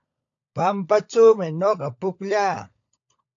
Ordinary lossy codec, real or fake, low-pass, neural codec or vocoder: AAC, 48 kbps; fake; 7.2 kHz; codec, 16 kHz, 16 kbps, FunCodec, trained on LibriTTS, 50 frames a second